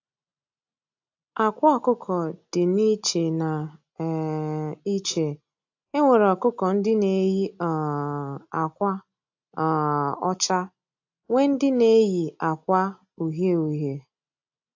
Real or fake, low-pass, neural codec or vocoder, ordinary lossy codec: real; 7.2 kHz; none; AAC, 48 kbps